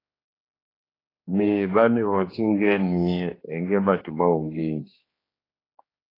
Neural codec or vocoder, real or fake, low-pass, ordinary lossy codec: codec, 16 kHz, 2 kbps, X-Codec, HuBERT features, trained on general audio; fake; 5.4 kHz; AAC, 24 kbps